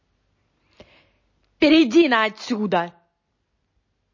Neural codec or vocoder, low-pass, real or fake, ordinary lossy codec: none; 7.2 kHz; real; MP3, 32 kbps